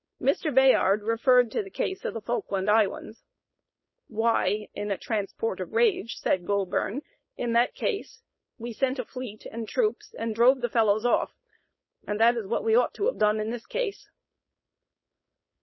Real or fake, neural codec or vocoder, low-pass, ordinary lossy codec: fake; codec, 16 kHz, 4.8 kbps, FACodec; 7.2 kHz; MP3, 24 kbps